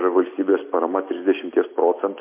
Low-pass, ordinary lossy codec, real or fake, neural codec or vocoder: 3.6 kHz; MP3, 24 kbps; real; none